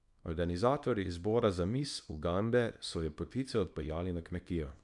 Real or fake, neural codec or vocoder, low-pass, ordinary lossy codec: fake; codec, 24 kHz, 0.9 kbps, WavTokenizer, small release; 10.8 kHz; none